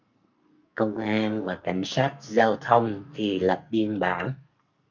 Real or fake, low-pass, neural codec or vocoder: fake; 7.2 kHz; codec, 44.1 kHz, 2.6 kbps, SNAC